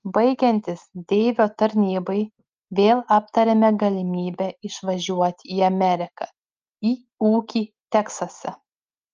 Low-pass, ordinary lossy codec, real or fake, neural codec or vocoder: 7.2 kHz; Opus, 24 kbps; real; none